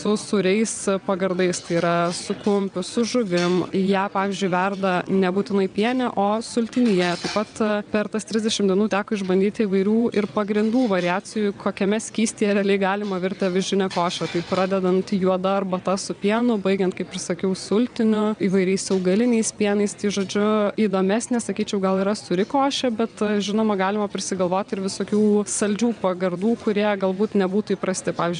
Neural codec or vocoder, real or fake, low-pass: vocoder, 22.05 kHz, 80 mel bands, WaveNeXt; fake; 9.9 kHz